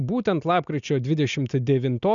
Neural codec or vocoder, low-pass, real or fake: none; 7.2 kHz; real